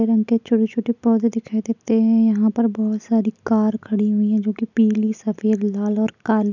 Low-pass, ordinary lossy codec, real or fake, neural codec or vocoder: 7.2 kHz; none; real; none